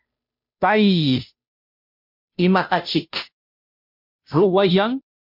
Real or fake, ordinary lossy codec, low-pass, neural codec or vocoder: fake; MP3, 48 kbps; 5.4 kHz; codec, 16 kHz, 0.5 kbps, FunCodec, trained on Chinese and English, 25 frames a second